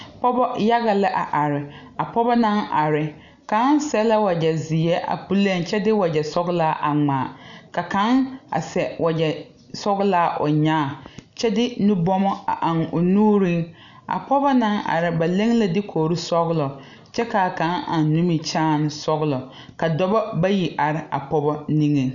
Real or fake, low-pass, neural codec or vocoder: real; 7.2 kHz; none